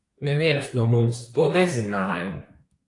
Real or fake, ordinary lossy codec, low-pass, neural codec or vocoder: fake; AAC, 48 kbps; 10.8 kHz; codec, 24 kHz, 1 kbps, SNAC